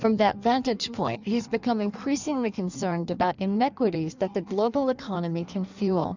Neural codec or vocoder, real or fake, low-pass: codec, 16 kHz in and 24 kHz out, 1.1 kbps, FireRedTTS-2 codec; fake; 7.2 kHz